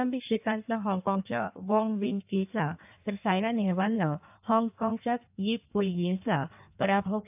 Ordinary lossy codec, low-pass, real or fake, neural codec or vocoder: AAC, 32 kbps; 3.6 kHz; fake; codec, 16 kHz in and 24 kHz out, 1.1 kbps, FireRedTTS-2 codec